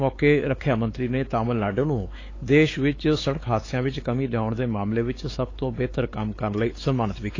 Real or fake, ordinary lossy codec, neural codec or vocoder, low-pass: fake; AAC, 32 kbps; codec, 16 kHz, 4 kbps, X-Codec, WavLM features, trained on Multilingual LibriSpeech; 7.2 kHz